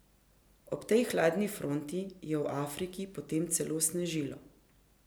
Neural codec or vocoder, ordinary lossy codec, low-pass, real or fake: none; none; none; real